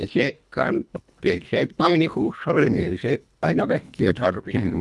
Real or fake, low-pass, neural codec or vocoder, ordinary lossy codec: fake; none; codec, 24 kHz, 1.5 kbps, HILCodec; none